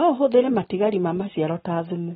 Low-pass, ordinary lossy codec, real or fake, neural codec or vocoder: 19.8 kHz; AAC, 16 kbps; real; none